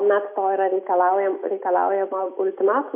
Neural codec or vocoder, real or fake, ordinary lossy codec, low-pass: none; real; MP3, 24 kbps; 3.6 kHz